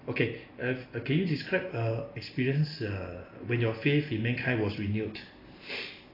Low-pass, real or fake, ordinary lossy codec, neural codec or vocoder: 5.4 kHz; real; AAC, 24 kbps; none